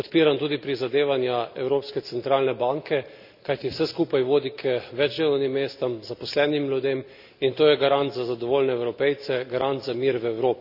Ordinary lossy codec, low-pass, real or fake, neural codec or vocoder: none; 5.4 kHz; real; none